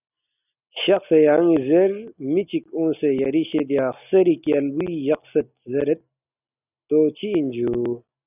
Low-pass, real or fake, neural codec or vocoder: 3.6 kHz; real; none